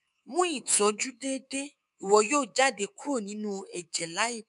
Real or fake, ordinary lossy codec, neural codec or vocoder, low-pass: fake; none; codec, 24 kHz, 3.1 kbps, DualCodec; 10.8 kHz